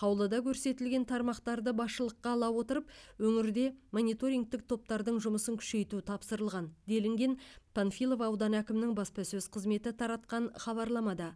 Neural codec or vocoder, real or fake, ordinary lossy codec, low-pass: none; real; none; none